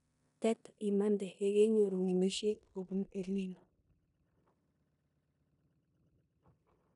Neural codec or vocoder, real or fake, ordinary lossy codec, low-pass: codec, 16 kHz in and 24 kHz out, 0.9 kbps, LongCat-Audio-Codec, four codebook decoder; fake; none; 10.8 kHz